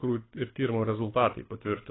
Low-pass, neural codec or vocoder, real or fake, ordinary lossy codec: 7.2 kHz; codec, 24 kHz, 6 kbps, HILCodec; fake; AAC, 16 kbps